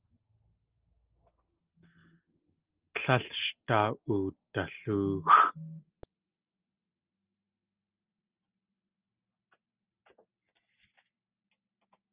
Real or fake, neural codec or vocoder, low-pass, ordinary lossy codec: real; none; 3.6 kHz; Opus, 24 kbps